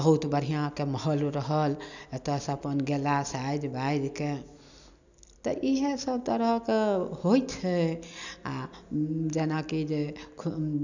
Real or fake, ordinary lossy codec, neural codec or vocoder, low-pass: real; none; none; 7.2 kHz